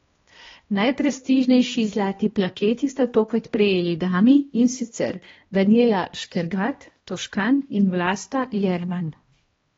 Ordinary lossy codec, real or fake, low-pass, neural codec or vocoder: AAC, 24 kbps; fake; 7.2 kHz; codec, 16 kHz, 1 kbps, X-Codec, HuBERT features, trained on balanced general audio